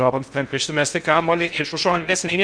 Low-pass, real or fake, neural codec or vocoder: 9.9 kHz; fake; codec, 16 kHz in and 24 kHz out, 0.6 kbps, FocalCodec, streaming, 2048 codes